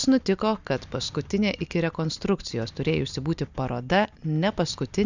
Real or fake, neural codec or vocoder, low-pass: real; none; 7.2 kHz